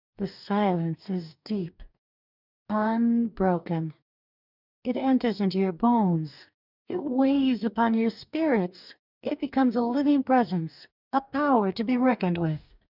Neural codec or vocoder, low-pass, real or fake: codec, 44.1 kHz, 2.6 kbps, DAC; 5.4 kHz; fake